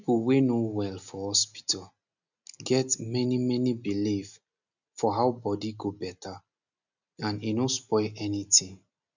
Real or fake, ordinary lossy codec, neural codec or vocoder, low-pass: real; none; none; 7.2 kHz